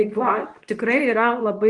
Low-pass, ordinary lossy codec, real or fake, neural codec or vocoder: 10.8 kHz; Opus, 24 kbps; fake; codec, 24 kHz, 0.9 kbps, WavTokenizer, medium speech release version 2